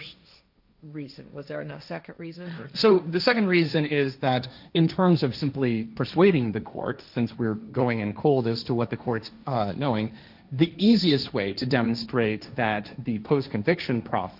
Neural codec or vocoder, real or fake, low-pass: codec, 16 kHz, 1.1 kbps, Voila-Tokenizer; fake; 5.4 kHz